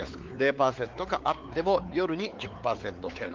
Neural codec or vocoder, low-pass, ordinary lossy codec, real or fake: codec, 16 kHz, 4 kbps, X-Codec, WavLM features, trained on Multilingual LibriSpeech; 7.2 kHz; Opus, 32 kbps; fake